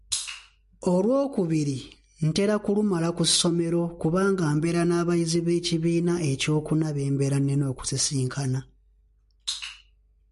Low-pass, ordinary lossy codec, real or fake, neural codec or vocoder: 14.4 kHz; MP3, 48 kbps; real; none